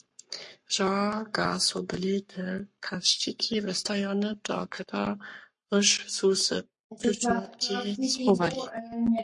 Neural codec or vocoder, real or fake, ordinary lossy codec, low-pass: codec, 44.1 kHz, 7.8 kbps, Pupu-Codec; fake; MP3, 48 kbps; 10.8 kHz